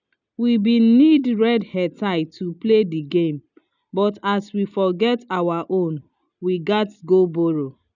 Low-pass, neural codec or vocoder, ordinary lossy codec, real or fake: 7.2 kHz; none; none; real